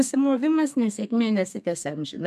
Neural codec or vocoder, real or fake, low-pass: codec, 32 kHz, 1.9 kbps, SNAC; fake; 14.4 kHz